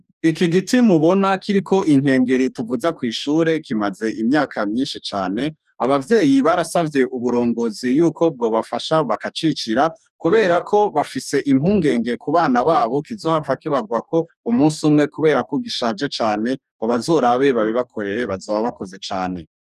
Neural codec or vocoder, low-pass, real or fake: codec, 44.1 kHz, 2.6 kbps, DAC; 14.4 kHz; fake